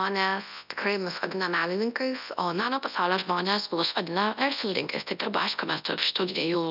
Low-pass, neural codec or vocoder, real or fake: 5.4 kHz; codec, 24 kHz, 0.9 kbps, WavTokenizer, large speech release; fake